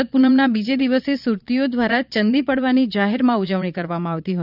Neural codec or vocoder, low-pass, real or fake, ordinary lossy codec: vocoder, 22.05 kHz, 80 mel bands, Vocos; 5.4 kHz; fake; none